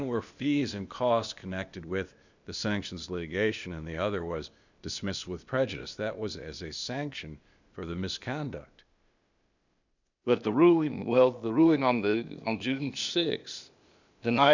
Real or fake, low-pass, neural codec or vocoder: fake; 7.2 kHz; codec, 16 kHz, 0.8 kbps, ZipCodec